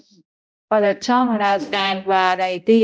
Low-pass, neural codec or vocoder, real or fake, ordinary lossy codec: none; codec, 16 kHz, 0.5 kbps, X-Codec, HuBERT features, trained on balanced general audio; fake; none